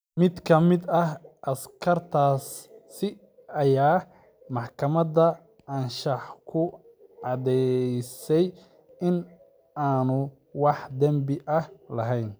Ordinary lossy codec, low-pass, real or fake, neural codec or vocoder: none; none; real; none